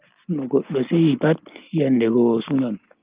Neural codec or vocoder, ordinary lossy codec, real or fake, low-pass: none; Opus, 32 kbps; real; 3.6 kHz